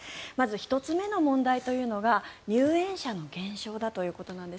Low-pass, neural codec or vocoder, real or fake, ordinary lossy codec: none; none; real; none